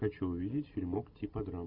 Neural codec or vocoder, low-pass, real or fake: none; 5.4 kHz; real